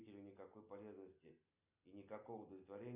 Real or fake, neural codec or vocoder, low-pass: real; none; 3.6 kHz